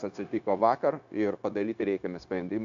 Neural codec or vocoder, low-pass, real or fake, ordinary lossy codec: codec, 16 kHz, 0.9 kbps, LongCat-Audio-Codec; 7.2 kHz; fake; AAC, 64 kbps